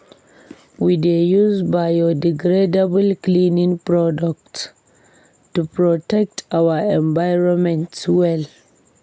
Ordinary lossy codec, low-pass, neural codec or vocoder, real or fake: none; none; none; real